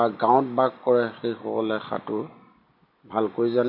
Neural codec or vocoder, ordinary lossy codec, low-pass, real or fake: none; MP3, 32 kbps; 5.4 kHz; real